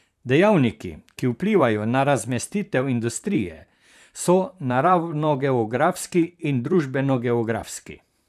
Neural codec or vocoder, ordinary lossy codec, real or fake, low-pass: vocoder, 44.1 kHz, 128 mel bands, Pupu-Vocoder; none; fake; 14.4 kHz